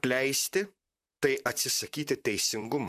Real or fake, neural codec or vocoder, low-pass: fake; vocoder, 44.1 kHz, 128 mel bands, Pupu-Vocoder; 14.4 kHz